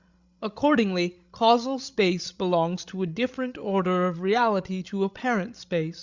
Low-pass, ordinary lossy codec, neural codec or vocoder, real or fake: 7.2 kHz; Opus, 64 kbps; codec, 16 kHz, 16 kbps, FreqCodec, larger model; fake